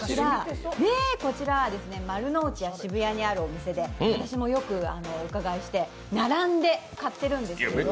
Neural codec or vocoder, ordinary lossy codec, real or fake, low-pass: none; none; real; none